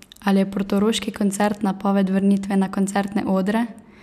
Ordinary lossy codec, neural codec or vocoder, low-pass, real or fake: none; none; 14.4 kHz; real